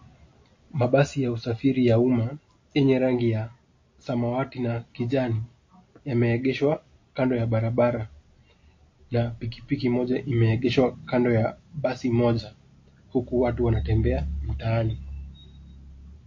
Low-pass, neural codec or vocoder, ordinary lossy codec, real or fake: 7.2 kHz; none; MP3, 32 kbps; real